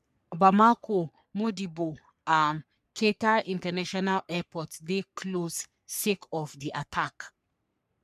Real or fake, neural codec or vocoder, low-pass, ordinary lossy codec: fake; codec, 44.1 kHz, 3.4 kbps, Pupu-Codec; 14.4 kHz; none